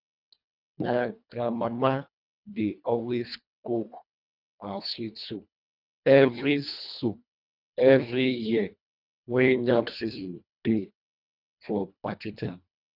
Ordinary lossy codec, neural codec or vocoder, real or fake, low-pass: none; codec, 24 kHz, 1.5 kbps, HILCodec; fake; 5.4 kHz